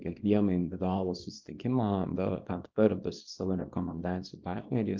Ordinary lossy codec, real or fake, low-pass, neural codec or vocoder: Opus, 24 kbps; fake; 7.2 kHz; codec, 24 kHz, 0.9 kbps, WavTokenizer, small release